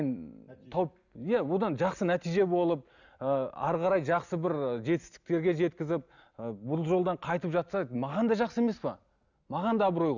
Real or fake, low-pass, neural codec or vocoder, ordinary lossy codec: real; 7.2 kHz; none; none